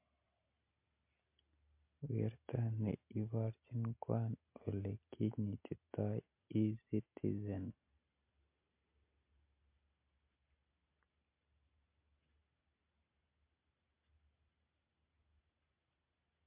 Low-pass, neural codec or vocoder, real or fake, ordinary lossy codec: 3.6 kHz; none; real; MP3, 24 kbps